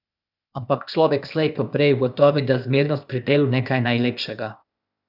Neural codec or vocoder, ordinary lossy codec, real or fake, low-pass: codec, 16 kHz, 0.8 kbps, ZipCodec; none; fake; 5.4 kHz